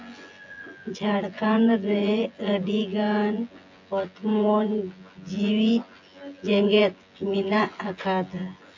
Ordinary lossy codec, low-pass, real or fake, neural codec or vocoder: AAC, 48 kbps; 7.2 kHz; fake; vocoder, 24 kHz, 100 mel bands, Vocos